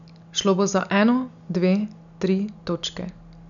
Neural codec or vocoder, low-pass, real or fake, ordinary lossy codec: none; 7.2 kHz; real; none